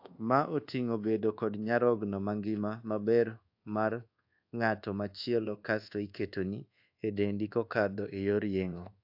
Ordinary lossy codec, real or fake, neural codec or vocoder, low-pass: AAC, 48 kbps; fake; codec, 24 kHz, 1.2 kbps, DualCodec; 5.4 kHz